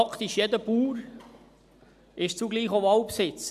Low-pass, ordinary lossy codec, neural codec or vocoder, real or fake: 14.4 kHz; none; none; real